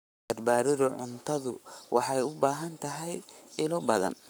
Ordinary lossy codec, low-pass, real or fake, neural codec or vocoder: none; none; fake; codec, 44.1 kHz, 7.8 kbps, Pupu-Codec